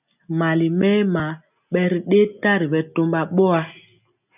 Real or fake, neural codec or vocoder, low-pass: real; none; 3.6 kHz